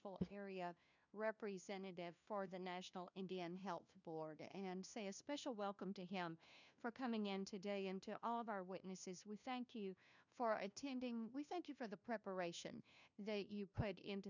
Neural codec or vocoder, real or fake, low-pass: codec, 16 kHz, 1 kbps, FunCodec, trained on LibriTTS, 50 frames a second; fake; 7.2 kHz